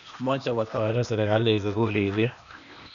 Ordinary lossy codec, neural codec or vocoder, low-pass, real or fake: none; codec, 16 kHz, 0.8 kbps, ZipCodec; 7.2 kHz; fake